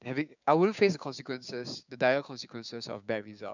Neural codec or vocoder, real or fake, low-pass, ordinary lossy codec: codec, 16 kHz, 6 kbps, DAC; fake; 7.2 kHz; none